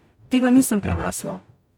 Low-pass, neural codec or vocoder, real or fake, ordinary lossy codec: 19.8 kHz; codec, 44.1 kHz, 0.9 kbps, DAC; fake; none